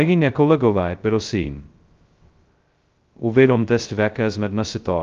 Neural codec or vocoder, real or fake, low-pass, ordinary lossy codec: codec, 16 kHz, 0.2 kbps, FocalCodec; fake; 7.2 kHz; Opus, 32 kbps